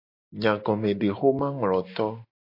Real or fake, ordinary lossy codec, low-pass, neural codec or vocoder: real; MP3, 32 kbps; 5.4 kHz; none